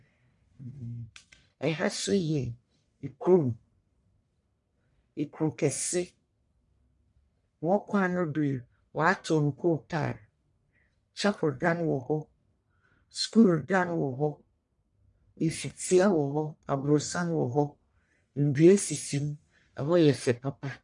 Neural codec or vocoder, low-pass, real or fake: codec, 44.1 kHz, 1.7 kbps, Pupu-Codec; 10.8 kHz; fake